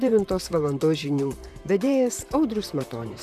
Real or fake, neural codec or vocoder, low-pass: fake; vocoder, 44.1 kHz, 128 mel bands, Pupu-Vocoder; 14.4 kHz